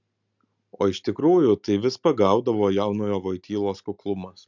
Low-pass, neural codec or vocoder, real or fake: 7.2 kHz; none; real